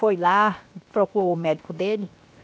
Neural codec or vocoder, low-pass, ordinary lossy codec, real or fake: codec, 16 kHz, 0.7 kbps, FocalCodec; none; none; fake